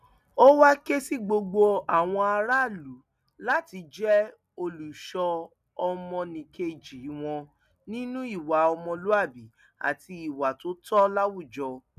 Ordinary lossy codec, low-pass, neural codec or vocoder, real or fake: none; 14.4 kHz; none; real